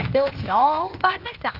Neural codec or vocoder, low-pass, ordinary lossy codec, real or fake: codec, 16 kHz, 0.8 kbps, ZipCodec; 5.4 kHz; Opus, 32 kbps; fake